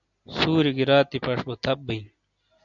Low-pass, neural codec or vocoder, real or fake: 7.2 kHz; none; real